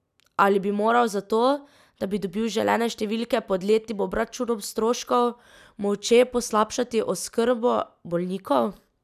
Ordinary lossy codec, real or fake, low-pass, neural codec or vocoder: none; real; 14.4 kHz; none